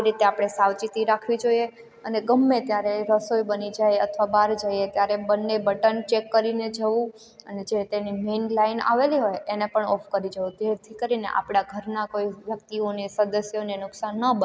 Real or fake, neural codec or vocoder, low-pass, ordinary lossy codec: real; none; none; none